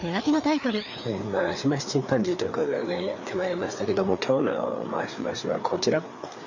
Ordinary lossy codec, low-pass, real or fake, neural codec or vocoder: none; 7.2 kHz; fake; codec, 16 kHz, 4 kbps, FreqCodec, larger model